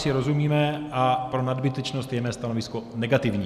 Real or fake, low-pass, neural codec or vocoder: real; 14.4 kHz; none